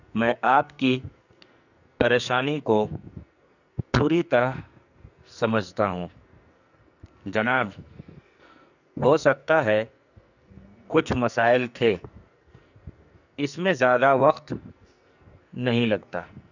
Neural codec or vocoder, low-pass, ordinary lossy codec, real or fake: codec, 44.1 kHz, 2.6 kbps, SNAC; 7.2 kHz; none; fake